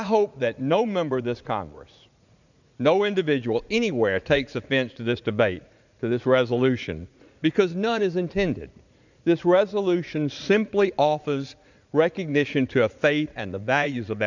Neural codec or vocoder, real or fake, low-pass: vocoder, 44.1 kHz, 80 mel bands, Vocos; fake; 7.2 kHz